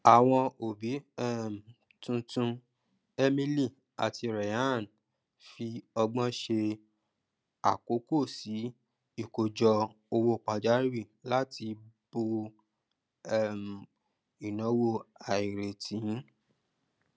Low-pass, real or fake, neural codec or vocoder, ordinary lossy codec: none; real; none; none